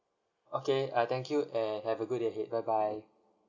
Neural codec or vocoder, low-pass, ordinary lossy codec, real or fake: none; 7.2 kHz; none; real